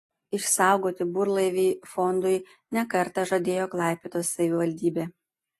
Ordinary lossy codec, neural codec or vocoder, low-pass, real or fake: AAC, 48 kbps; none; 14.4 kHz; real